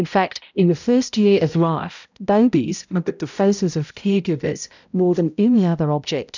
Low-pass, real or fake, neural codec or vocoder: 7.2 kHz; fake; codec, 16 kHz, 0.5 kbps, X-Codec, HuBERT features, trained on balanced general audio